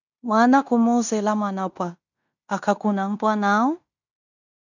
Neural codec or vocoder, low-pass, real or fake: codec, 16 kHz in and 24 kHz out, 0.9 kbps, LongCat-Audio-Codec, fine tuned four codebook decoder; 7.2 kHz; fake